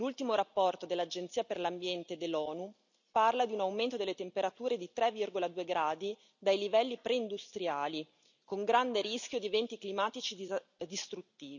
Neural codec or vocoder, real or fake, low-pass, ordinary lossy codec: none; real; 7.2 kHz; none